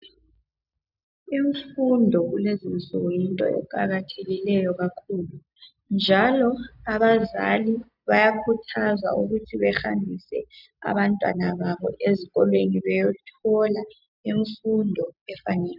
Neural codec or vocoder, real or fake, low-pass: none; real; 5.4 kHz